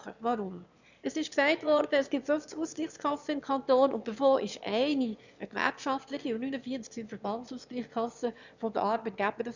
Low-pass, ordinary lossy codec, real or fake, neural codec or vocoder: 7.2 kHz; none; fake; autoencoder, 22.05 kHz, a latent of 192 numbers a frame, VITS, trained on one speaker